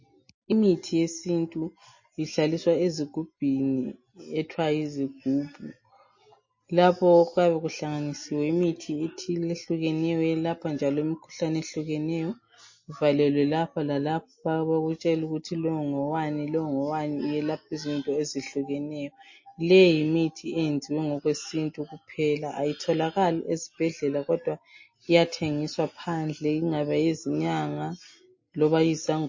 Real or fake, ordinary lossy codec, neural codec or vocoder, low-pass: real; MP3, 32 kbps; none; 7.2 kHz